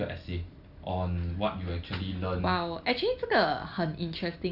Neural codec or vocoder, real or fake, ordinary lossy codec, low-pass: none; real; none; 5.4 kHz